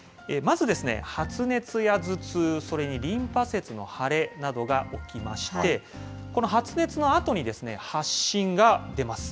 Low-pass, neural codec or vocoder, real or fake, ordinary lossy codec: none; none; real; none